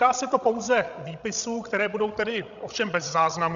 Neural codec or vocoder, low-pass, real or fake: codec, 16 kHz, 16 kbps, FreqCodec, larger model; 7.2 kHz; fake